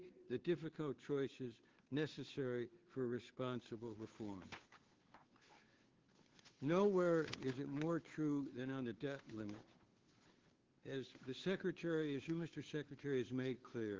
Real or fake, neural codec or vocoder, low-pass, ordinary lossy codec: fake; codec, 16 kHz, 2 kbps, FunCodec, trained on Chinese and English, 25 frames a second; 7.2 kHz; Opus, 16 kbps